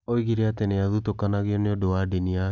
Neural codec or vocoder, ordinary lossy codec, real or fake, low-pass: none; none; real; 7.2 kHz